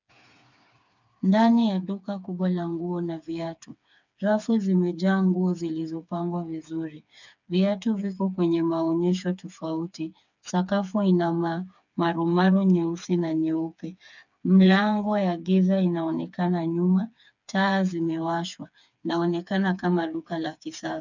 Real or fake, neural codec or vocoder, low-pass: fake; codec, 16 kHz, 4 kbps, FreqCodec, smaller model; 7.2 kHz